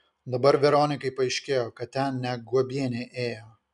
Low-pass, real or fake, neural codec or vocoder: 10.8 kHz; real; none